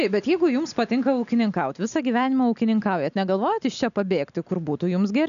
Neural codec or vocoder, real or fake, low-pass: none; real; 7.2 kHz